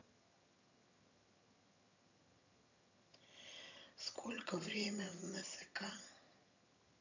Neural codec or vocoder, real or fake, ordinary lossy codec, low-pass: vocoder, 22.05 kHz, 80 mel bands, HiFi-GAN; fake; none; 7.2 kHz